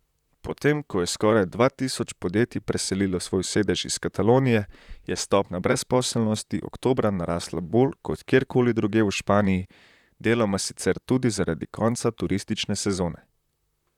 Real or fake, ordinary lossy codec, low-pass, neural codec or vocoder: fake; none; 19.8 kHz; vocoder, 44.1 kHz, 128 mel bands, Pupu-Vocoder